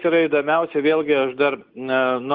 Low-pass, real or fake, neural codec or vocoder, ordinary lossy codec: 5.4 kHz; real; none; Opus, 32 kbps